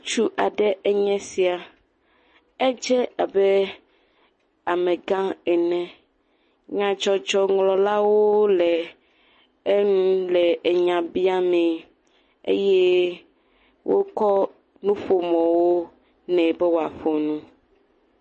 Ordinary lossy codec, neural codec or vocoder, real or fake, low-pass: MP3, 32 kbps; none; real; 10.8 kHz